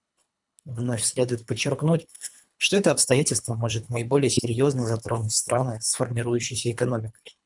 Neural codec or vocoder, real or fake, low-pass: codec, 24 kHz, 3 kbps, HILCodec; fake; 10.8 kHz